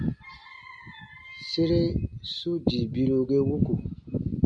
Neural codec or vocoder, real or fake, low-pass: none; real; 9.9 kHz